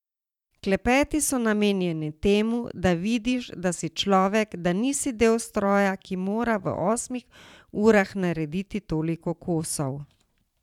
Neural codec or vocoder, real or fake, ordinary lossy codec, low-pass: vocoder, 44.1 kHz, 128 mel bands every 512 samples, BigVGAN v2; fake; none; 19.8 kHz